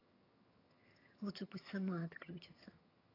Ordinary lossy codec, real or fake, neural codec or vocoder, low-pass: AAC, 24 kbps; fake; vocoder, 22.05 kHz, 80 mel bands, HiFi-GAN; 5.4 kHz